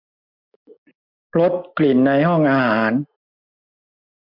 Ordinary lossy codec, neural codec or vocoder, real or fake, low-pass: MP3, 48 kbps; none; real; 5.4 kHz